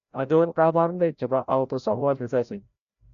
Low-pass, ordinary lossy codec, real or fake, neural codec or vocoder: 7.2 kHz; none; fake; codec, 16 kHz, 0.5 kbps, FreqCodec, larger model